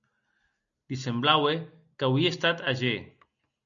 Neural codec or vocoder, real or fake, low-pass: none; real; 7.2 kHz